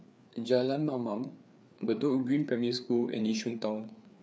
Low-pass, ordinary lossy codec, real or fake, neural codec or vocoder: none; none; fake; codec, 16 kHz, 4 kbps, FreqCodec, larger model